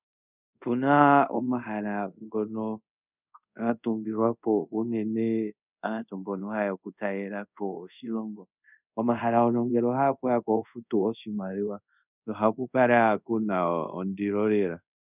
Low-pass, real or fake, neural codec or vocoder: 3.6 kHz; fake; codec, 24 kHz, 0.5 kbps, DualCodec